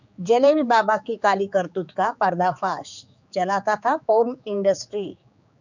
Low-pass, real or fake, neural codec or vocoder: 7.2 kHz; fake; codec, 16 kHz, 4 kbps, X-Codec, HuBERT features, trained on general audio